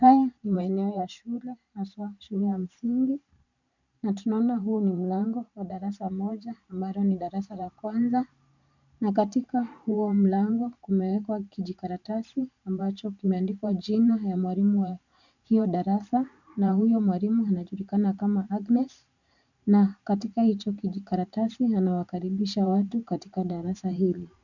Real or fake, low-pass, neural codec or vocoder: fake; 7.2 kHz; vocoder, 44.1 kHz, 128 mel bands every 512 samples, BigVGAN v2